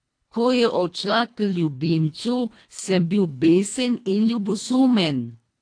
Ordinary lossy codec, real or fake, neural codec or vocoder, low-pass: AAC, 48 kbps; fake; codec, 24 kHz, 1.5 kbps, HILCodec; 9.9 kHz